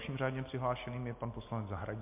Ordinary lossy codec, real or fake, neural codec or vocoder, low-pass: MP3, 24 kbps; real; none; 3.6 kHz